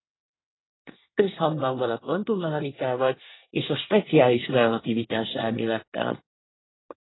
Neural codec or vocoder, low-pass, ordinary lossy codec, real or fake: codec, 24 kHz, 1 kbps, SNAC; 7.2 kHz; AAC, 16 kbps; fake